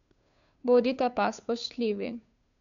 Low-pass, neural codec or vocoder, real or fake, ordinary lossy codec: 7.2 kHz; codec, 16 kHz, 2 kbps, FunCodec, trained on Chinese and English, 25 frames a second; fake; none